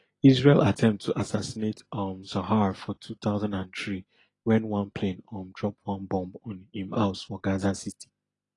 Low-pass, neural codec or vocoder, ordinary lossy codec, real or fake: 10.8 kHz; none; AAC, 32 kbps; real